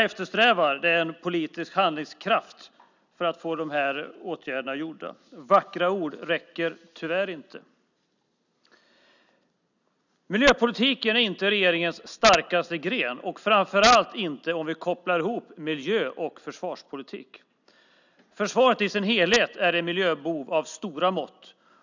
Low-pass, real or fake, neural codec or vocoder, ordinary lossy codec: 7.2 kHz; real; none; none